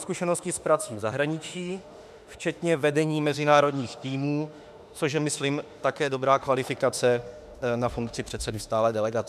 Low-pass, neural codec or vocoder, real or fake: 14.4 kHz; autoencoder, 48 kHz, 32 numbers a frame, DAC-VAE, trained on Japanese speech; fake